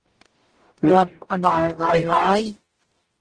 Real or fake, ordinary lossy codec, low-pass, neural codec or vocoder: fake; Opus, 16 kbps; 9.9 kHz; codec, 44.1 kHz, 0.9 kbps, DAC